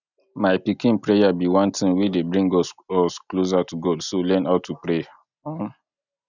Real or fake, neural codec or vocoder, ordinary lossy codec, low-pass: real; none; none; 7.2 kHz